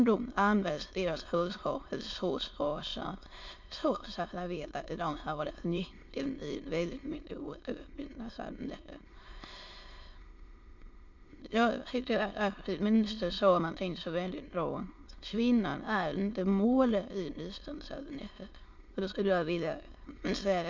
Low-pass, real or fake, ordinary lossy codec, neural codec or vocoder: 7.2 kHz; fake; MP3, 48 kbps; autoencoder, 22.05 kHz, a latent of 192 numbers a frame, VITS, trained on many speakers